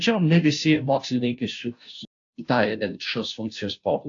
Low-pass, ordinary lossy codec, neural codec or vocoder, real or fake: 7.2 kHz; AAC, 48 kbps; codec, 16 kHz, 0.5 kbps, FunCodec, trained on Chinese and English, 25 frames a second; fake